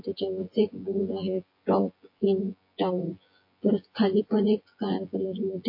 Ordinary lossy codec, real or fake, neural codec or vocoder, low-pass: MP3, 32 kbps; fake; vocoder, 24 kHz, 100 mel bands, Vocos; 5.4 kHz